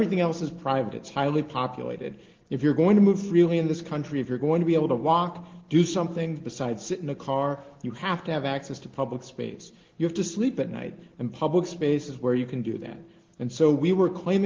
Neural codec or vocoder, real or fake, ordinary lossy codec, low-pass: none; real; Opus, 16 kbps; 7.2 kHz